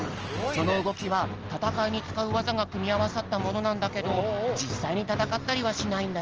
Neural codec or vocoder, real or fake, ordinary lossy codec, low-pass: none; real; Opus, 16 kbps; 7.2 kHz